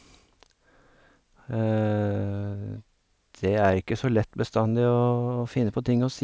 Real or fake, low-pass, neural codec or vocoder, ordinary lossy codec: real; none; none; none